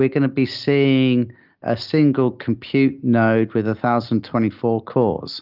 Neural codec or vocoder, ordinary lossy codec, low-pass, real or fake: none; Opus, 24 kbps; 5.4 kHz; real